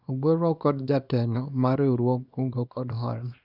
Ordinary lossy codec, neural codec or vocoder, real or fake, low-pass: none; codec, 24 kHz, 0.9 kbps, WavTokenizer, small release; fake; 5.4 kHz